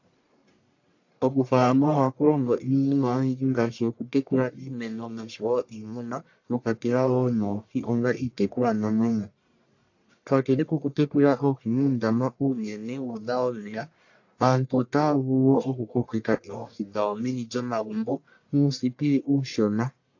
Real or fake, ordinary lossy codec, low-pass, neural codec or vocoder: fake; AAC, 48 kbps; 7.2 kHz; codec, 44.1 kHz, 1.7 kbps, Pupu-Codec